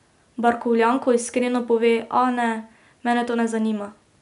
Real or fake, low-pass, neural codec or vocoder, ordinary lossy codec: real; 10.8 kHz; none; none